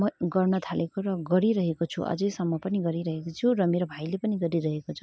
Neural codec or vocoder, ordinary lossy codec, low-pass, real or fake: none; none; none; real